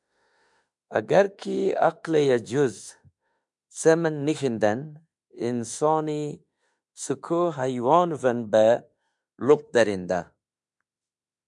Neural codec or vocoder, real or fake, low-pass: autoencoder, 48 kHz, 32 numbers a frame, DAC-VAE, trained on Japanese speech; fake; 10.8 kHz